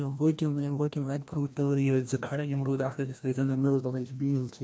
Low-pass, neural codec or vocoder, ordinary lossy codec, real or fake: none; codec, 16 kHz, 1 kbps, FreqCodec, larger model; none; fake